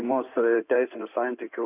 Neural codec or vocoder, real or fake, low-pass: codec, 16 kHz in and 24 kHz out, 2.2 kbps, FireRedTTS-2 codec; fake; 3.6 kHz